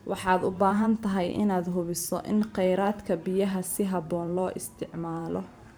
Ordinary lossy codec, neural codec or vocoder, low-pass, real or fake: none; vocoder, 44.1 kHz, 128 mel bands every 512 samples, BigVGAN v2; none; fake